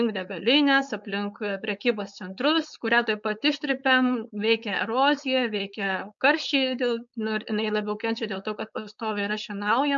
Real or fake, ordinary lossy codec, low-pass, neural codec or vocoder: fake; MP3, 96 kbps; 7.2 kHz; codec, 16 kHz, 4.8 kbps, FACodec